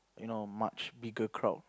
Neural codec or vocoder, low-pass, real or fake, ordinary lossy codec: none; none; real; none